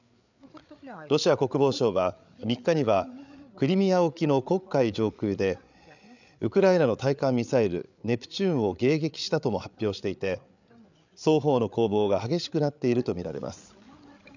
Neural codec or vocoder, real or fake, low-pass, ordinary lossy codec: codec, 16 kHz, 8 kbps, FreqCodec, larger model; fake; 7.2 kHz; none